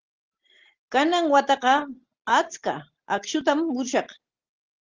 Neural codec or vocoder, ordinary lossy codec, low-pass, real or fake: none; Opus, 16 kbps; 7.2 kHz; real